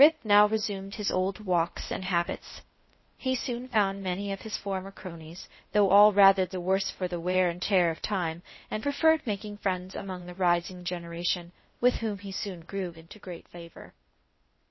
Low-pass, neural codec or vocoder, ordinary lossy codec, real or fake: 7.2 kHz; codec, 16 kHz, 0.8 kbps, ZipCodec; MP3, 24 kbps; fake